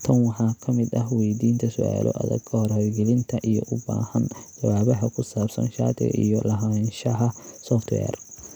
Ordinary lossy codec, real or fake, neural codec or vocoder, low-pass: none; real; none; 19.8 kHz